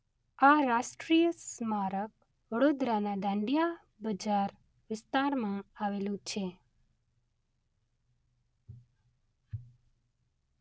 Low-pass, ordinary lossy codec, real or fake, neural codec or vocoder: none; none; real; none